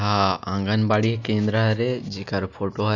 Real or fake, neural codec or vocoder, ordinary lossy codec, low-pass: real; none; none; 7.2 kHz